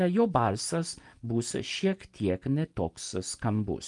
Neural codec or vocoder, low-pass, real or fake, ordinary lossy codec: none; 10.8 kHz; real; Opus, 32 kbps